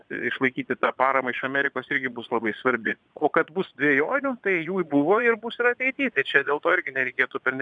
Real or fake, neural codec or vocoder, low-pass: fake; vocoder, 22.05 kHz, 80 mel bands, Vocos; 9.9 kHz